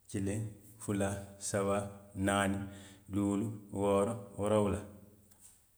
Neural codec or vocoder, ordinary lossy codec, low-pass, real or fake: none; none; none; real